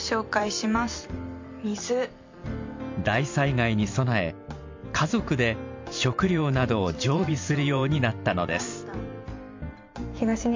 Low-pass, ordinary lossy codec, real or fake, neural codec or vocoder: 7.2 kHz; MP3, 48 kbps; fake; vocoder, 44.1 kHz, 128 mel bands every 512 samples, BigVGAN v2